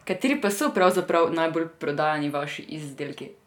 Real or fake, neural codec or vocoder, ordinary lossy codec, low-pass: real; none; none; 19.8 kHz